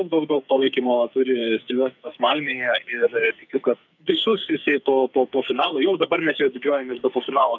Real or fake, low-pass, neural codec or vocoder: fake; 7.2 kHz; codec, 44.1 kHz, 2.6 kbps, SNAC